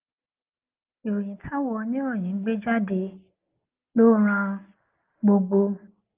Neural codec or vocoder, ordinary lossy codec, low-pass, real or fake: none; Opus, 32 kbps; 3.6 kHz; real